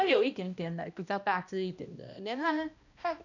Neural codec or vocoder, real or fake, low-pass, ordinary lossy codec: codec, 16 kHz, 1 kbps, X-Codec, HuBERT features, trained on balanced general audio; fake; 7.2 kHz; none